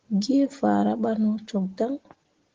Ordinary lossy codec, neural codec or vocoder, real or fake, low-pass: Opus, 16 kbps; none; real; 7.2 kHz